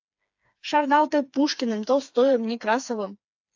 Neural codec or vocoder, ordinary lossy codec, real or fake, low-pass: codec, 16 kHz, 4 kbps, FreqCodec, smaller model; AAC, 48 kbps; fake; 7.2 kHz